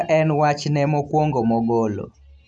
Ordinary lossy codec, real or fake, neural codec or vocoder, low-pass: none; real; none; none